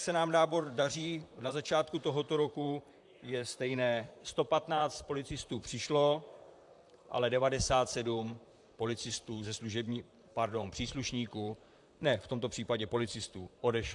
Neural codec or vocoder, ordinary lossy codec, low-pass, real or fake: vocoder, 44.1 kHz, 128 mel bands, Pupu-Vocoder; AAC, 64 kbps; 10.8 kHz; fake